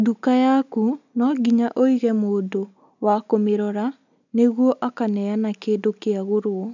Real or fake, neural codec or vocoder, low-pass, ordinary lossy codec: real; none; 7.2 kHz; none